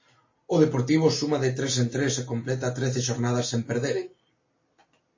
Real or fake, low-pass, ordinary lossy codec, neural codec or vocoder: real; 7.2 kHz; MP3, 32 kbps; none